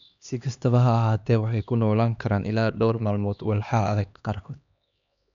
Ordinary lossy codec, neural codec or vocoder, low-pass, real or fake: none; codec, 16 kHz, 2 kbps, X-Codec, HuBERT features, trained on LibriSpeech; 7.2 kHz; fake